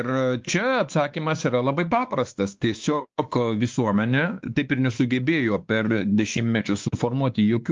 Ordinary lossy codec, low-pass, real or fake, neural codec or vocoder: Opus, 32 kbps; 7.2 kHz; fake; codec, 16 kHz, 2 kbps, X-Codec, WavLM features, trained on Multilingual LibriSpeech